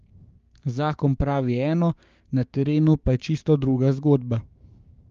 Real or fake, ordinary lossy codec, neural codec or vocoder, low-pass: fake; Opus, 32 kbps; codec, 16 kHz, 6 kbps, DAC; 7.2 kHz